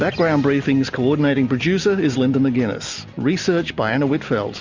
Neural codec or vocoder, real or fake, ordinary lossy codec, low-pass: none; real; Opus, 64 kbps; 7.2 kHz